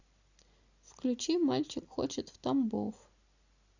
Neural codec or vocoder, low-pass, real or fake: none; 7.2 kHz; real